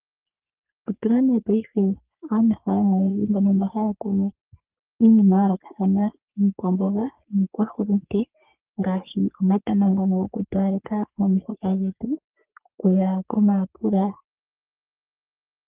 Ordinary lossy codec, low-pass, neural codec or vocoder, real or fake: Opus, 32 kbps; 3.6 kHz; codec, 44.1 kHz, 3.4 kbps, Pupu-Codec; fake